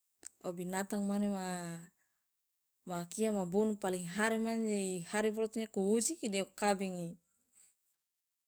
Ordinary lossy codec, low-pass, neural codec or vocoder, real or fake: none; none; codec, 44.1 kHz, 7.8 kbps, DAC; fake